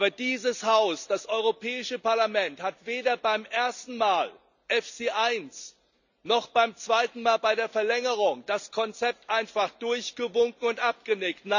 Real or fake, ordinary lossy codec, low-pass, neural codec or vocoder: real; none; 7.2 kHz; none